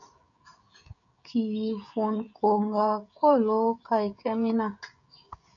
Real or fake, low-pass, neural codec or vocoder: fake; 7.2 kHz; codec, 16 kHz, 16 kbps, FreqCodec, smaller model